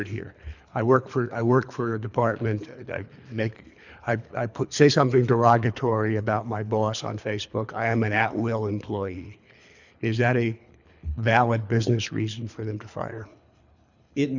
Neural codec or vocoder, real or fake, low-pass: codec, 24 kHz, 3 kbps, HILCodec; fake; 7.2 kHz